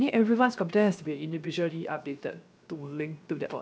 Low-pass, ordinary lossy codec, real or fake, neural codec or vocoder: none; none; fake; codec, 16 kHz, 0.7 kbps, FocalCodec